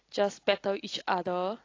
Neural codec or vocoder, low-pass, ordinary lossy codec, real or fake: none; 7.2 kHz; AAC, 32 kbps; real